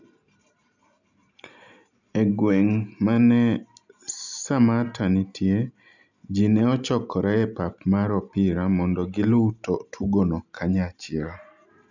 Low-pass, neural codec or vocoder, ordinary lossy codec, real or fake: 7.2 kHz; none; none; real